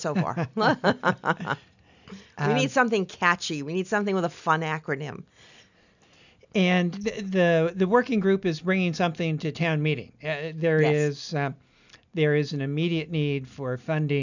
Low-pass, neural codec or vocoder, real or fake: 7.2 kHz; none; real